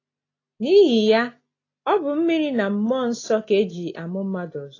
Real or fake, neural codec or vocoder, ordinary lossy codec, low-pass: real; none; AAC, 32 kbps; 7.2 kHz